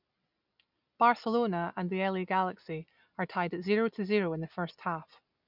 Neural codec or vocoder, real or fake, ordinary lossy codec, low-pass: none; real; none; 5.4 kHz